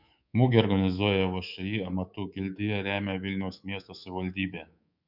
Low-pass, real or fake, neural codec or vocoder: 5.4 kHz; fake; codec, 24 kHz, 3.1 kbps, DualCodec